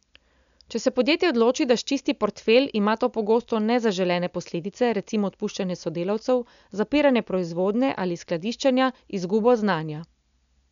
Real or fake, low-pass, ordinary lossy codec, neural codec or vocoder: real; 7.2 kHz; none; none